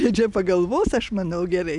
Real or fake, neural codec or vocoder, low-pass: real; none; 10.8 kHz